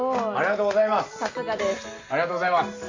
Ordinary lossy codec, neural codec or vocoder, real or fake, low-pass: AAC, 32 kbps; none; real; 7.2 kHz